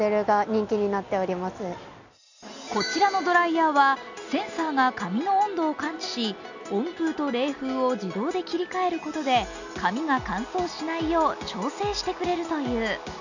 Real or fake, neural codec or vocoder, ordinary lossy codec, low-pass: real; none; none; 7.2 kHz